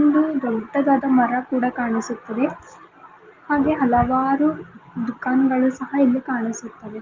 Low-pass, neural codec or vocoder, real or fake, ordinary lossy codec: 7.2 kHz; none; real; Opus, 24 kbps